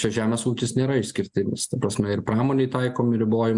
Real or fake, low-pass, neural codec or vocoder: real; 10.8 kHz; none